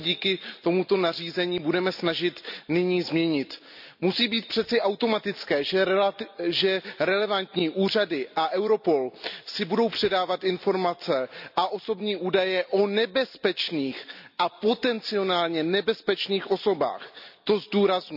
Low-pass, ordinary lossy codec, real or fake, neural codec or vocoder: 5.4 kHz; none; real; none